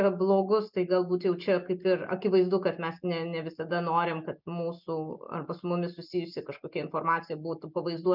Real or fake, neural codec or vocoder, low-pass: real; none; 5.4 kHz